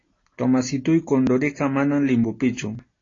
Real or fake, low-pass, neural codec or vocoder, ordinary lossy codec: real; 7.2 kHz; none; AAC, 32 kbps